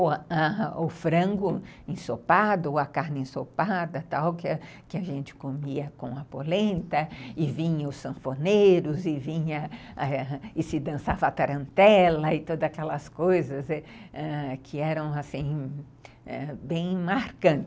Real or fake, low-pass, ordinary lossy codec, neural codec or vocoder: real; none; none; none